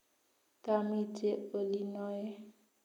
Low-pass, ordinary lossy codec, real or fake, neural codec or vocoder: 19.8 kHz; none; real; none